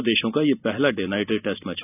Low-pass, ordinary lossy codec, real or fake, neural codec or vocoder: 3.6 kHz; none; real; none